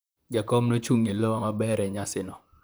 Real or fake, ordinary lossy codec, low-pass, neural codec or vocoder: fake; none; none; vocoder, 44.1 kHz, 128 mel bands, Pupu-Vocoder